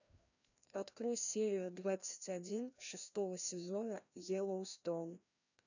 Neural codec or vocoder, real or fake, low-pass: codec, 16 kHz, 1 kbps, FreqCodec, larger model; fake; 7.2 kHz